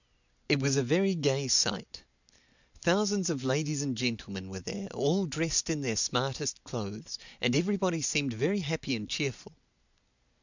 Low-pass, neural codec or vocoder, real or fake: 7.2 kHz; none; real